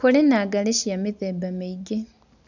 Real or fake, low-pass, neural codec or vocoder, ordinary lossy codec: real; 7.2 kHz; none; none